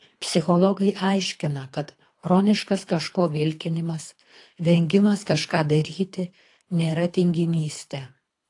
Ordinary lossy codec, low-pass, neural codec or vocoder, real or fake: AAC, 48 kbps; 10.8 kHz; codec, 24 kHz, 3 kbps, HILCodec; fake